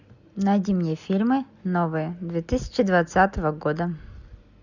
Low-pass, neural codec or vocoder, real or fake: 7.2 kHz; none; real